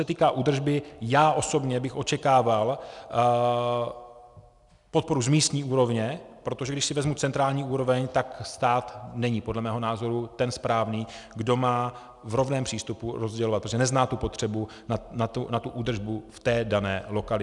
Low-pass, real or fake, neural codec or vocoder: 10.8 kHz; real; none